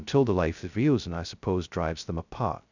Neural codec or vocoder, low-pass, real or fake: codec, 16 kHz, 0.2 kbps, FocalCodec; 7.2 kHz; fake